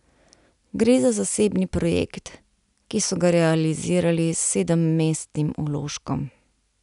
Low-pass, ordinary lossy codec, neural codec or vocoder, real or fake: 10.8 kHz; none; none; real